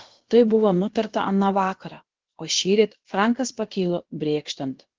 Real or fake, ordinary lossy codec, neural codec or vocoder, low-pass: fake; Opus, 16 kbps; codec, 16 kHz, about 1 kbps, DyCAST, with the encoder's durations; 7.2 kHz